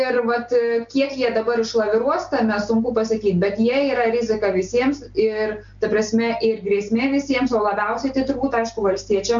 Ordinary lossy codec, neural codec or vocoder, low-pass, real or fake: MP3, 64 kbps; none; 7.2 kHz; real